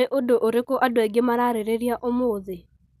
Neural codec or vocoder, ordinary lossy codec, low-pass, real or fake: none; none; 14.4 kHz; real